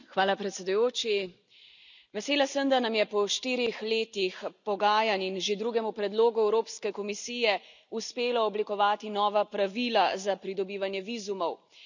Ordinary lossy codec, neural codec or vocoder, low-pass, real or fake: none; none; 7.2 kHz; real